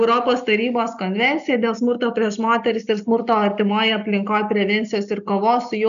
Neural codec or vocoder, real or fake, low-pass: none; real; 7.2 kHz